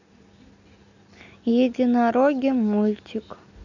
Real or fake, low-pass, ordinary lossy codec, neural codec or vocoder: real; 7.2 kHz; none; none